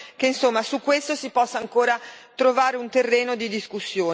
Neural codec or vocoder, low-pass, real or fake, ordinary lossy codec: none; none; real; none